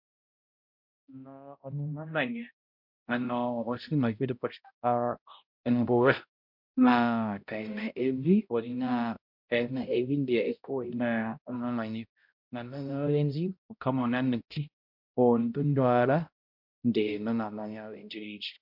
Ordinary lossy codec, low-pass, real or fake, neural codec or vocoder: MP3, 48 kbps; 5.4 kHz; fake; codec, 16 kHz, 0.5 kbps, X-Codec, HuBERT features, trained on balanced general audio